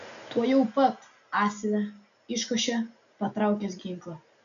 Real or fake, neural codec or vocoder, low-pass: real; none; 7.2 kHz